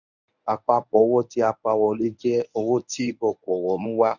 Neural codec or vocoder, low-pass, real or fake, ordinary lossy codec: codec, 24 kHz, 0.9 kbps, WavTokenizer, medium speech release version 1; 7.2 kHz; fake; none